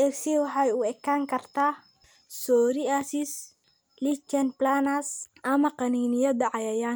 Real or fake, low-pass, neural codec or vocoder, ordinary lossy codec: real; none; none; none